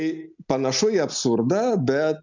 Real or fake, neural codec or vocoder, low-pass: real; none; 7.2 kHz